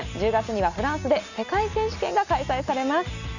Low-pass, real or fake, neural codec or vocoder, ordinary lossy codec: 7.2 kHz; real; none; none